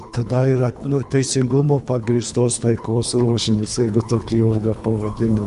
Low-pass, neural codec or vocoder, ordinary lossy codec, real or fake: 10.8 kHz; codec, 24 kHz, 3 kbps, HILCodec; AAC, 96 kbps; fake